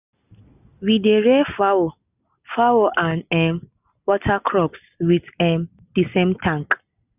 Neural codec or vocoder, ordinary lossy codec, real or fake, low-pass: none; none; real; 3.6 kHz